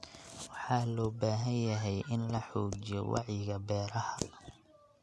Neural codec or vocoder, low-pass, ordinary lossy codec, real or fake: none; none; none; real